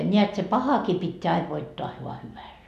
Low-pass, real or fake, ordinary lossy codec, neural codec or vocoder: 14.4 kHz; real; none; none